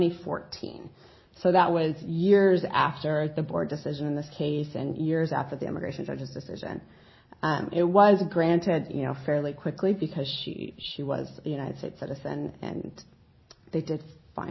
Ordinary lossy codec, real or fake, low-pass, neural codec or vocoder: MP3, 24 kbps; real; 7.2 kHz; none